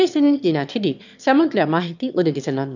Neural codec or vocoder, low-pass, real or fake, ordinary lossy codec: autoencoder, 22.05 kHz, a latent of 192 numbers a frame, VITS, trained on one speaker; 7.2 kHz; fake; none